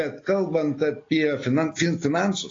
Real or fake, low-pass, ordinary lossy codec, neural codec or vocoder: real; 7.2 kHz; AAC, 32 kbps; none